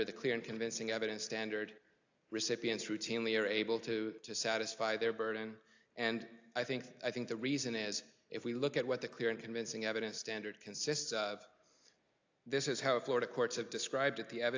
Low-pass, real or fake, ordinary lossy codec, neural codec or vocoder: 7.2 kHz; real; AAC, 48 kbps; none